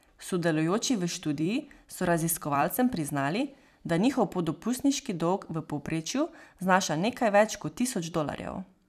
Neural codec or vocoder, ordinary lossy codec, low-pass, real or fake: vocoder, 44.1 kHz, 128 mel bands every 256 samples, BigVGAN v2; none; 14.4 kHz; fake